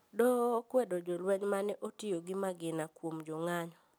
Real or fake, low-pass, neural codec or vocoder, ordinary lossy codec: real; none; none; none